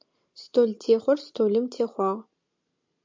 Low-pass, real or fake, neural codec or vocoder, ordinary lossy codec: 7.2 kHz; real; none; MP3, 64 kbps